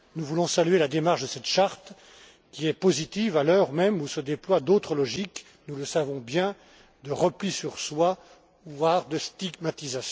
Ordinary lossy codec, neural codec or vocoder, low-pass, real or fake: none; none; none; real